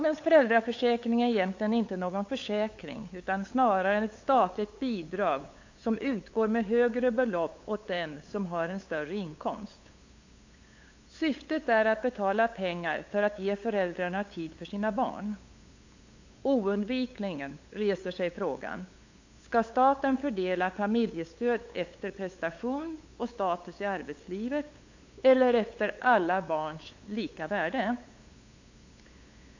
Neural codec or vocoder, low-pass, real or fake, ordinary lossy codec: codec, 16 kHz, 8 kbps, FunCodec, trained on LibriTTS, 25 frames a second; 7.2 kHz; fake; AAC, 48 kbps